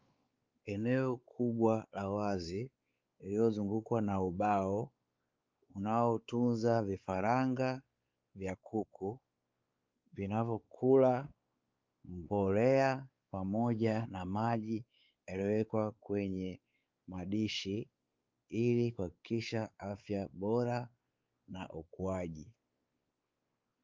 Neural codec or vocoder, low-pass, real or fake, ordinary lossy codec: codec, 16 kHz, 4 kbps, X-Codec, WavLM features, trained on Multilingual LibriSpeech; 7.2 kHz; fake; Opus, 24 kbps